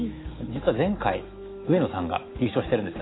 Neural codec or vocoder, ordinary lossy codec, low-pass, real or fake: autoencoder, 48 kHz, 128 numbers a frame, DAC-VAE, trained on Japanese speech; AAC, 16 kbps; 7.2 kHz; fake